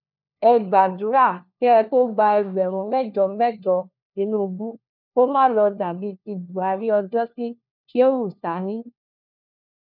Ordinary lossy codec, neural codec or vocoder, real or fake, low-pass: none; codec, 16 kHz, 1 kbps, FunCodec, trained on LibriTTS, 50 frames a second; fake; 5.4 kHz